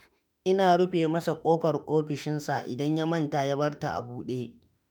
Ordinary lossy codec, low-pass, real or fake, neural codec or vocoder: none; none; fake; autoencoder, 48 kHz, 32 numbers a frame, DAC-VAE, trained on Japanese speech